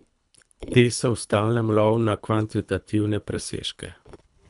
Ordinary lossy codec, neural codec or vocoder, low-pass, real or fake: none; codec, 24 kHz, 3 kbps, HILCodec; 10.8 kHz; fake